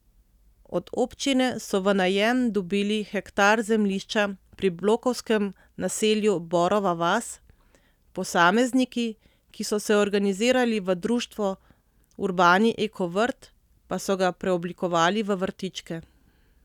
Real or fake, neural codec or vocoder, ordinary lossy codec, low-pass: real; none; none; 19.8 kHz